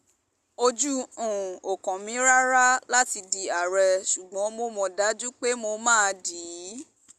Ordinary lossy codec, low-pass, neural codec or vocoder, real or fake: Opus, 64 kbps; 14.4 kHz; none; real